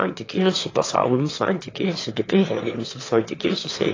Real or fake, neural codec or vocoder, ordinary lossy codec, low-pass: fake; autoencoder, 22.05 kHz, a latent of 192 numbers a frame, VITS, trained on one speaker; AAC, 32 kbps; 7.2 kHz